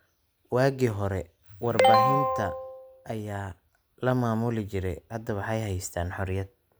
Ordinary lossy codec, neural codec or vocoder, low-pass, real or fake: none; none; none; real